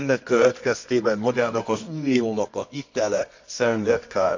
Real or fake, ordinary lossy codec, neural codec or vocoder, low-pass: fake; MP3, 64 kbps; codec, 24 kHz, 0.9 kbps, WavTokenizer, medium music audio release; 7.2 kHz